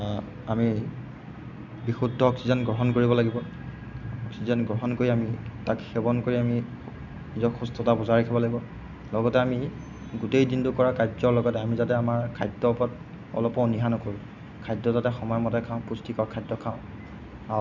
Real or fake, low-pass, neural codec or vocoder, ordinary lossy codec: real; 7.2 kHz; none; none